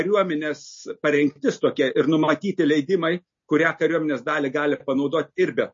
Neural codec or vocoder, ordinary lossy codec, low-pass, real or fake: none; MP3, 32 kbps; 7.2 kHz; real